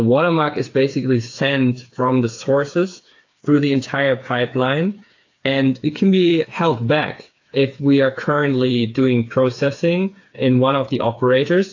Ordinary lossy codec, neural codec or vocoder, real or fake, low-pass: AAC, 48 kbps; codec, 16 kHz, 4 kbps, FreqCodec, smaller model; fake; 7.2 kHz